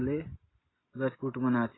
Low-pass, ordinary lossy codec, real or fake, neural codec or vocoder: 7.2 kHz; AAC, 16 kbps; real; none